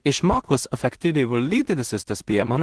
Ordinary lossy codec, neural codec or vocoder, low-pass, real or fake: Opus, 16 kbps; codec, 16 kHz in and 24 kHz out, 0.4 kbps, LongCat-Audio-Codec, two codebook decoder; 10.8 kHz; fake